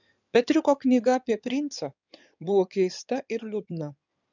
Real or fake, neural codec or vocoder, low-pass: fake; codec, 16 kHz in and 24 kHz out, 2.2 kbps, FireRedTTS-2 codec; 7.2 kHz